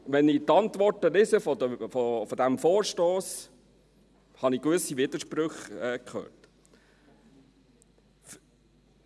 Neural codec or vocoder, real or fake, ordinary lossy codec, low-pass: none; real; none; none